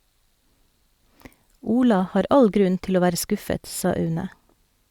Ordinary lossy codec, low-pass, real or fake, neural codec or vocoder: Opus, 64 kbps; 19.8 kHz; real; none